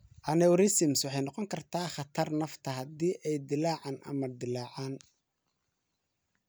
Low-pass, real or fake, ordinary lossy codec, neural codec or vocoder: none; real; none; none